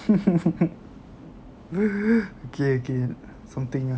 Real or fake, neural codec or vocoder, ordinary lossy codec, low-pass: real; none; none; none